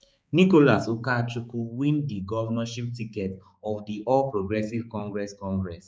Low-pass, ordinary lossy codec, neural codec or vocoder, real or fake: none; none; codec, 16 kHz, 4 kbps, X-Codec, HuBERT features, trained on balanced general audio; fake